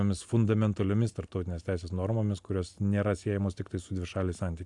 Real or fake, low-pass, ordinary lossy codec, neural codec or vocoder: real; 10.8 kHz; AAC, 64 kbps; none